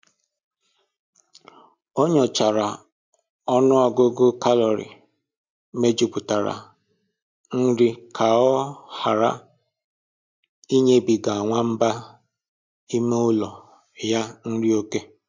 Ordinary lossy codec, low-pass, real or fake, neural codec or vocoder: MP3, 64 kbps; 7.2 kHz; real; none